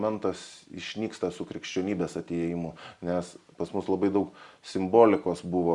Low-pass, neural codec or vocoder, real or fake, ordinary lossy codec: 10.8 kHz; none; real; Opus, 64 kbps